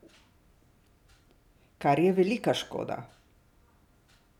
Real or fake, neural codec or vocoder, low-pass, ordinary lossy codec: real; none; 19.8 kHz; none